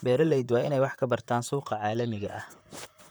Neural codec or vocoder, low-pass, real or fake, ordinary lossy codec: vocoder, 44.1 kHz, 128 mel bands, Pupu-Vocoder; none; fake; none